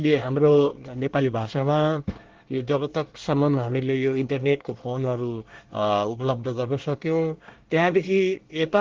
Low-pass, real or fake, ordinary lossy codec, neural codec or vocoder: 7.2 kHz; fake; Opus, 16 kbps; codec, 24 kHz, 1 kbps, SNAC